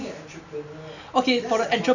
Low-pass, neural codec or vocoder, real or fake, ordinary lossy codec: 7.2 kHz; none; real; none